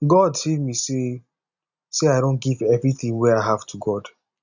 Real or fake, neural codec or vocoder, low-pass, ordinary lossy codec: real; none; 7.2 kHz; none